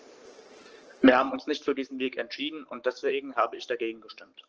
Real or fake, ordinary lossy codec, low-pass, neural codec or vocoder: fake; Opus, 16 kbps; 7.2 kHz; codec, 44.1 kHz, 7.8 kbps, Pupu-Codec